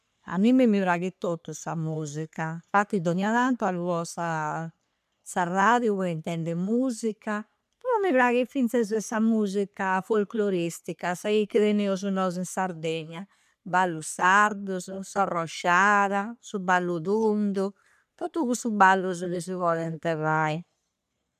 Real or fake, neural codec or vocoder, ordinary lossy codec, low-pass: fake; codec, 44.1 kHz, 3.4 kbps, Pupu-Codec; none; 14.4 kHz